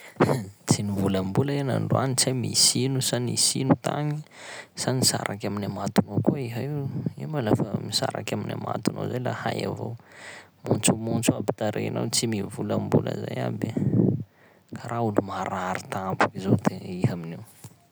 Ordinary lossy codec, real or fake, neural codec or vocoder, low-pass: none; real; none; none